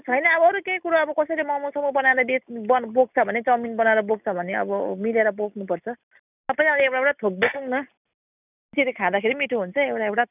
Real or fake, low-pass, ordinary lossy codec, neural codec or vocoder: real; 3.6 kHz; none; none